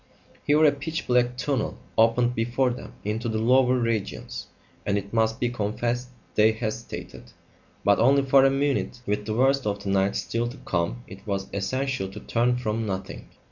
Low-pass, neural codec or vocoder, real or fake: 7.2 kHz; none; real